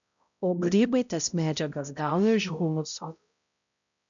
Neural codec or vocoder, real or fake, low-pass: codec, 16 kHz, 0.5 kbps, X-Codec, HuBERT features, trained on balanced general audio; fake; 7.2 kHz